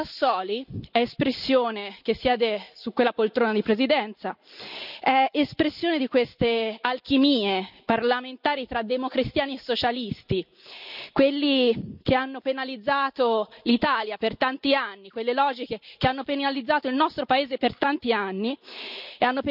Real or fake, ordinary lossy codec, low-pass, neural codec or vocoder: real; none; 5.4 kHz; none